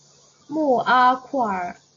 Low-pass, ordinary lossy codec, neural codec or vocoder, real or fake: 7.2 kHz; AAC, 32 kbps; none; real